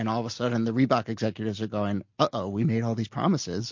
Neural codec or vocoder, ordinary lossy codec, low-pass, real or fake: none; MP3, 48 kbps; 7.2 kHz; real